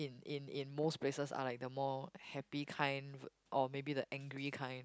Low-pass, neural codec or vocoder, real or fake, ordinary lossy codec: none; none; real; none